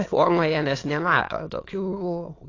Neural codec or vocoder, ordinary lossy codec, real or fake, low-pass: autoencoder, 22.05 kHz, a latent of 192 numbers a frame, VITS, trained on many speakers; AAC, 32 kbps; fake; 7.2 kHz